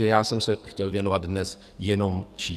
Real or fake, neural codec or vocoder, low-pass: fake; codec, 44.1 kHz, 2.6 kbps, SNAC; 14.4 kHz